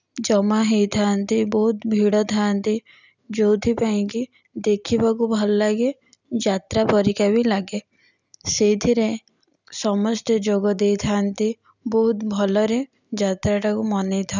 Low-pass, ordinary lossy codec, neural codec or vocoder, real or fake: 7.2 kHz; none; none; real